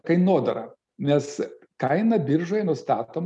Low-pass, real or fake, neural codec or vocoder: 10.8 kHz; real; none